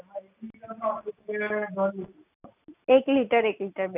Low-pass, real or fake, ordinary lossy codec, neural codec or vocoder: 3.6 kHz; real; none; none